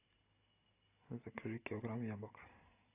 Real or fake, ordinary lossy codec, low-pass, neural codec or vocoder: real; AAC, 32 kbps; 3.6 kHz; none